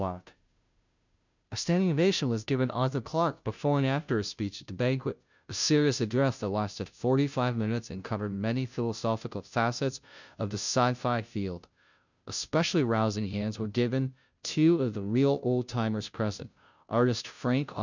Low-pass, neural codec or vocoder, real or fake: 7.2 kHz; codec, 16 kHz, 0.5 kbps, FunCodec, trained on Chinese and English, 25 frames a second; fake